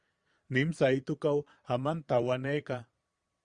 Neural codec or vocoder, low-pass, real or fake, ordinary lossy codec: vocoder, 22.05 kHz, 80 mel bands, Vocos; 9.9 kHz; fake; Opus, 64 kbps